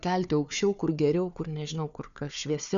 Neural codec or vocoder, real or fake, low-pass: codec, 16 kHz, 4 kbps, FunCodec, trained on Chinese and English, 50 frames a second; fake; 7.2 kHz